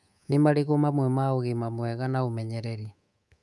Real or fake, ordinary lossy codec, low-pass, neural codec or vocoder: fake; none; none; codec, 24 kHz, 3.1 kbps, DualCodec